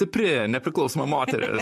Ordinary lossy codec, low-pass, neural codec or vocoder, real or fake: MP3, 64 kbps; 14.4 kHz; vocoder, 44.1 kHz, 128 mel bands, Pupu-Vocoder; fake